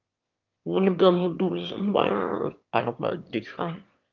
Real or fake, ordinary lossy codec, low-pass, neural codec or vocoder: fake; Opus, 24 kbps; 7.2 kHz; autoencoder, 22.05 kHz, a latent of 192 numbers a frame, VITS, trained on one speaker